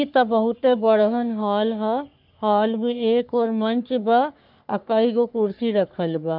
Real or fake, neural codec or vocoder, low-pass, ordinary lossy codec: fake; codec, 44.1 kHz, 3.4 kbps, Pupu-Codec; 5.4 kHz; none